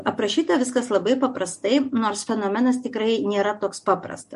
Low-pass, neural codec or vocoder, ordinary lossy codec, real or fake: 14.4 kHz; none; MP3, 48 kbps; real